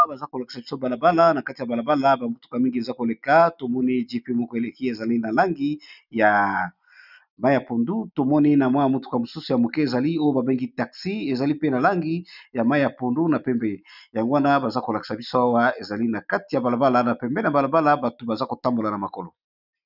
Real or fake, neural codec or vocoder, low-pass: real; none; 5.4 kHz